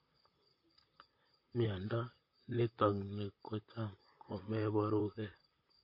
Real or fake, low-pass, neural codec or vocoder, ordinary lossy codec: fake; 5.4 kHz; vocoder, 44.1 kHz, 128 mel bands, Pupu-Vocoder; MP3, 32 kbps